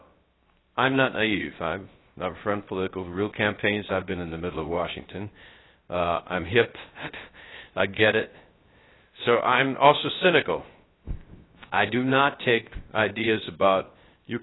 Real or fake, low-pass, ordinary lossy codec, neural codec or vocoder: fake; 7.2 kHz; AAC, 16 kbps; codec, 16 kHz, 0.3 kbps, FocalCodec